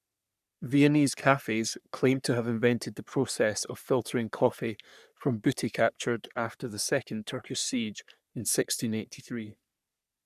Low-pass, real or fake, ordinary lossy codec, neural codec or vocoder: 14.4 kHz; fake; none; codec, 44.1 kHz, 3.4 kbps, Pupu-Codec